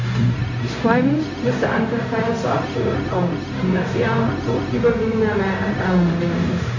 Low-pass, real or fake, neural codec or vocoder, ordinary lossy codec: 7.2 kHz; fake; codec, 16 kHz, 0.4 kbps, LongCat-Audio-Codec; AAC, 48 kbps